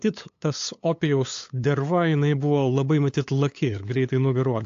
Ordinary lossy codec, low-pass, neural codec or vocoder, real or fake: AAC, 48 kbps; 7.2 kHz; codec, 16 kHz, 8 kbps, FunCodec, trained on LibriTTS, 25 frames a second; fake